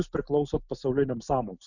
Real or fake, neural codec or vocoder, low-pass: real; none; 7.2 kHz